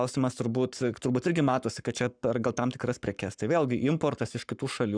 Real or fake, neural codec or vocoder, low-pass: fake; codec, 44.1 kHz, 7.8 kbps, Pupu-Codec; 9.9 kHz